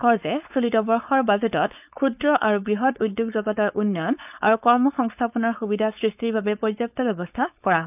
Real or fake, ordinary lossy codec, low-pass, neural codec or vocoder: fake; none; 3.6 kHz; codec, 16 kHz, 4.8 kbps, FACodec